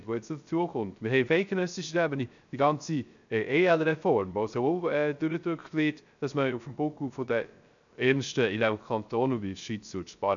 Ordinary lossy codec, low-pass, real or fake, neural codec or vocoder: none; 7.2 kHz; fake; codec, 16 kHz, 0.3 kbps, FocalCodec